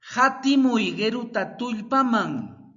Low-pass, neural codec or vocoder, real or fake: 7.2 kHz; none; real